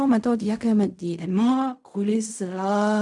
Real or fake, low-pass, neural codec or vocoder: fake; 10.8 kHz; codec, 16 kHz in and 24 kHz out, 0.4 kbps, LongCat-Audio-Codec, fine tuned four codebook decoder